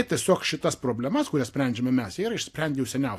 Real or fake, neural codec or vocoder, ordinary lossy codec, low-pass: real; none; AAC, 64 kbps; 14.4 kHz